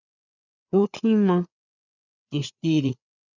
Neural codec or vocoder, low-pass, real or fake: codec, 16 kHz, 4 kbps, FreqCodec, larger model; 7.2 kHz; fake